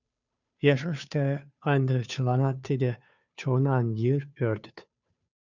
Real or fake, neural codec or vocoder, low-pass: fake; codec, 16 kHz, 2 kbps, FunCodec, trained on Chinese and English, 25 frames a second; 7.2 kHz